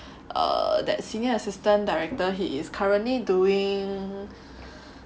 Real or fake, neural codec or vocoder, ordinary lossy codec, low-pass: real; none; none; none